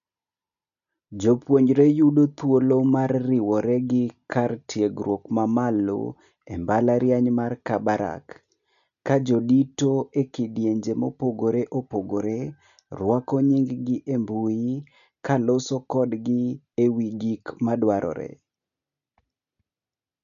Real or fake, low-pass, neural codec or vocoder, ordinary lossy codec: real; 7.2 kHz; none; none